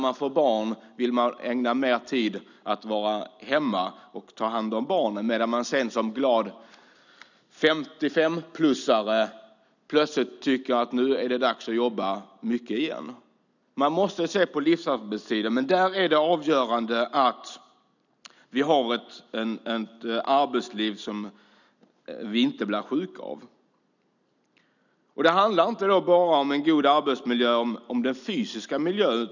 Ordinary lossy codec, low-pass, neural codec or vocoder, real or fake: none; 7.2 kHz; none; real